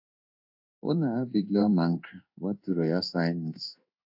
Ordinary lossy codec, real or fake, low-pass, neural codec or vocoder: AAC, 48 kbps; fake; 5.4 kHz; codec, 16 kHz in and 24 kHz out, 1 kbps, XY-Tokenizer